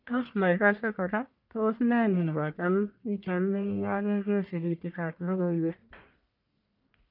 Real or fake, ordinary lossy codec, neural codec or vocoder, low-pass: fake; none; codec, 44.1 kHz, 1.7 kbps, Pupu-Codec; 5.4 kHz